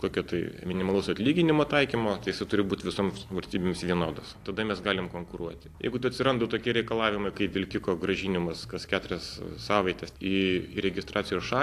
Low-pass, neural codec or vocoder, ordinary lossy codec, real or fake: 14.4 kHz; none; AAC, 64 kbps; real